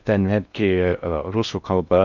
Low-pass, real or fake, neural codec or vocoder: 7.2 kHz; fake; codec, 16 kHz in and 24 kHz out, 0.6 kbps, FocalCodec, streaming, 2048 codes